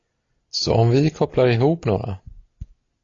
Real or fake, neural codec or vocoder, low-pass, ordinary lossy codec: real; none; 7.2 kHz; AAC, 32 kbps